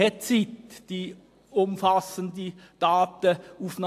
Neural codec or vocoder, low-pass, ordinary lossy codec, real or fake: none; 14.4 kHz; AAC, 64 kbps; real